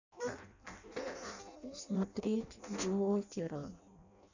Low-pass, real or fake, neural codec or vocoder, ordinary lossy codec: 7.2 kHz; fake; codec, 16 kHz in and 24 kHz out, 0.6 kbps, FireRedTTS-2 codec; none